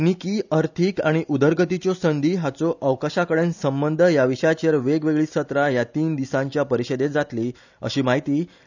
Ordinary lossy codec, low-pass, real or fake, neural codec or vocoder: none; 7.2 kHz; real; none